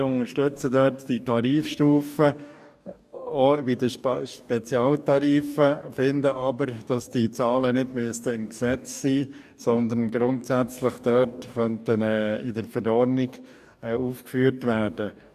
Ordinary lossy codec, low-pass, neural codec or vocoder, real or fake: none; 14.4 kHz; codec, 44.1 kHz, 2.6 kbps, DAC; fake